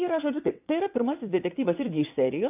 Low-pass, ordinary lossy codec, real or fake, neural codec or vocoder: 3.6 kHz; AAC, 32 kbps; fake; vocoder, 22.05 kHz, 80 mel bands, WaveNeXt